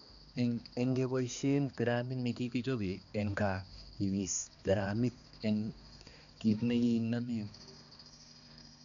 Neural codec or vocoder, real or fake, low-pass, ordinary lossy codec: codec, 16 kHz, 2 kbps, X-Codec, HuBERT features, trained on balanced general audio; fake; 7.2 kHz; none